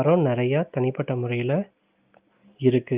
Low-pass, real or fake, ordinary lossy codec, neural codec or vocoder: 3.6 kHz; real; Opus, 24 kbps; none